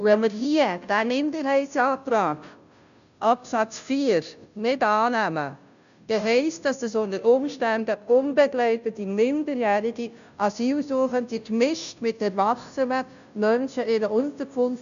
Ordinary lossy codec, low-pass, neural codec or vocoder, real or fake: none; 7.2 kHz; codec, 16 kHz, 0.5 kbps, FunCodec, trained on Chinese and English, 25 frames a second; fake